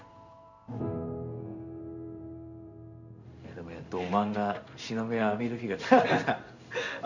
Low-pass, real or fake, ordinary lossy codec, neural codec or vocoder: 7.2 kHz; fake; none; codec, 16 kHz in and 24 kHz out, 1 kbps, XY-Tokenizer